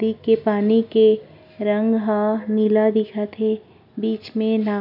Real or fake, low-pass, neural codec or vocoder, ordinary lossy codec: real; 5.4 kHz; none; none